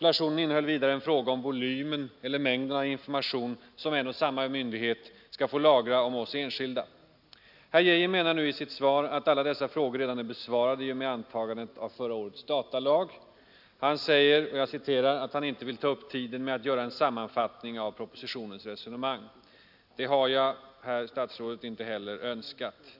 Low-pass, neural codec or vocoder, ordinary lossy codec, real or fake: 5.4 kHz; none; none; real